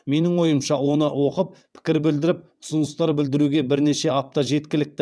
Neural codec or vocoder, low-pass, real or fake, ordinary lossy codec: vocoder, 22.05 kHz, 80 mel bands, WaveNeXt; none; fake; none